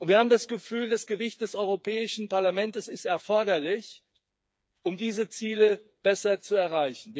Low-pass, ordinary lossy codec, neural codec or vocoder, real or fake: none; none; codec, 16 kHz, 4 kbps, FreqCodec, smaller model; fake